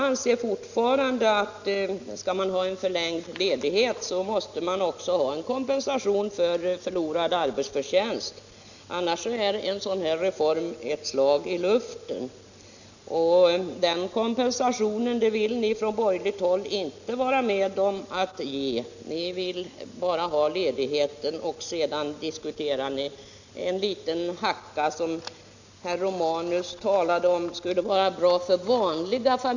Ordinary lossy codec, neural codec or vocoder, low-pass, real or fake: none; none; 7.2 kHz; real